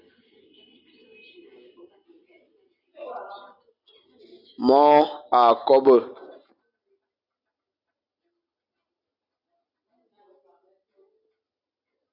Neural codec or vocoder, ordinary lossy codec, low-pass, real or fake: none; Opus, 64 kbps; 5.4 kHz; real